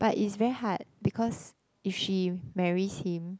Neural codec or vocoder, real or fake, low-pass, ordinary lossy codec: none; real; none; none